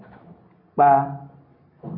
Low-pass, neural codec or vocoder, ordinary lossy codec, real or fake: 5.4 kHz; none; AAC, 24 kbps; real